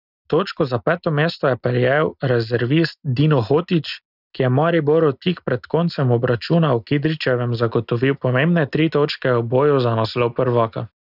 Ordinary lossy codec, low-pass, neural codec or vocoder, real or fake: none; 5.4 kHz; none; real